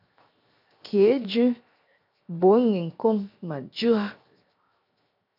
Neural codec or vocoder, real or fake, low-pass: codec, 16 kHz, 0.7 kbps, FocalCodec; fake; 5.4 kHz